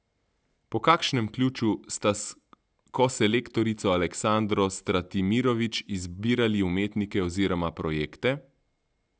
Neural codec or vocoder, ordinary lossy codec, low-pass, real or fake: none; none; none; real